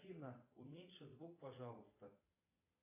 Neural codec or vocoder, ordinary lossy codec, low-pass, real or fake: vocoder, 22.05 kHz, 80 mel bands, Vocos; AAC, 24 kbps; 3.6 kHz; fake